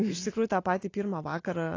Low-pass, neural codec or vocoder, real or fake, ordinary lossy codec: 7.2 kHz; none; real; AAC, 32 kbps